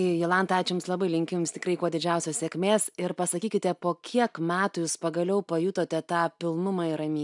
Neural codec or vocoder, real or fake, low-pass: none; real; 10.8 kHz